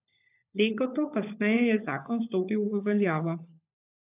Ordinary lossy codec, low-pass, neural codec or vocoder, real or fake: AAC, 32 kbps; 3.6 kHz; codec, 16 kHz, 16 kbps, FunCodec, trained on LibriTTS, 50 frames a second; fake